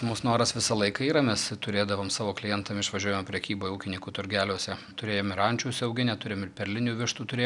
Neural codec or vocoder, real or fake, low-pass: none; real; 10.8 kHz